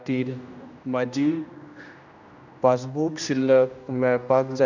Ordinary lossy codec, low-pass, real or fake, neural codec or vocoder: none; 7.2 kHz; fake; codec, 16 kHz, 1 kbps, X-Codec, HuBERT features, trained on general audio